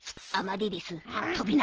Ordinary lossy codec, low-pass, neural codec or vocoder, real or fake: Opus, 16 kbps; 7.2 kHz; none; real